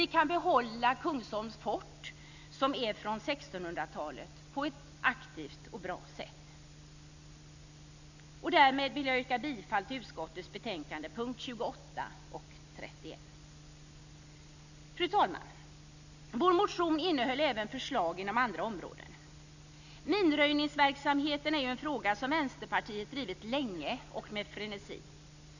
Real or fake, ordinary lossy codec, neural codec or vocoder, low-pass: real; none; none; 7.2 kHz